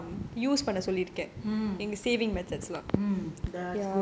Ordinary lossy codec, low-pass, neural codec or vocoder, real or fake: none; none; none; real